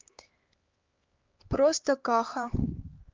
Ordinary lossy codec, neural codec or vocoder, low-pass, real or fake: Opus, 32 kbps; codec, 16 kHz, 4 kbps, X-Codec, HuBERT features, trained on LibriSpeech; 7.2 kHz; fake